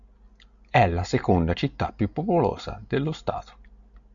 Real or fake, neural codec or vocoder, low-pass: real; none; 7.2 kHz